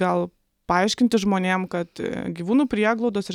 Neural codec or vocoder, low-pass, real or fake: none; 19.8 kHz; real